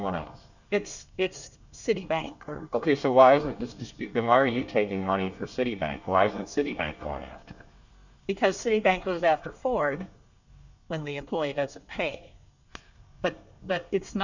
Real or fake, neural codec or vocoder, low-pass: fake; codec, 24 kHz, 1 kbps, SNAC; 7.2 kHz